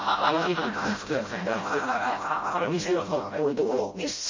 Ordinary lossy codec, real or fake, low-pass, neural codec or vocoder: MP3, 32 kbps; fake; 7.2 kHz; codec, 16 kHz, 0.5 kbps, FreqCodec, smaller model